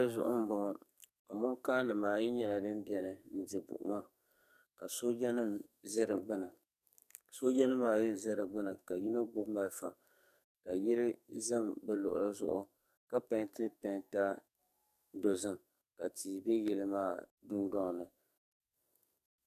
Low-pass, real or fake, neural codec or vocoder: 14.4 kHz; fake; codec, 44.1 kHz, 2.6 kbps, SNAC